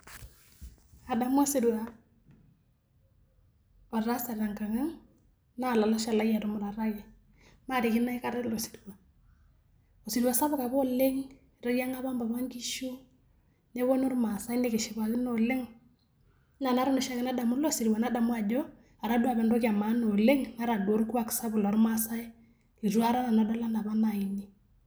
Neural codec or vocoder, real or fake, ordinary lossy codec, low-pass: none; real; none; none